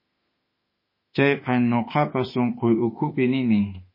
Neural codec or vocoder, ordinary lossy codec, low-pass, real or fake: autoencoder, 48 kHz, 32 numbers a frame, DAC-VAE, trained on Japanese speech; MP3, 24 kbps; 5.4 kHz; fake